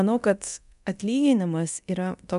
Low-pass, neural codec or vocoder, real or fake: 10.8 kHz; codec, 24 kHz, 0.9 kbps, DualCodec; fake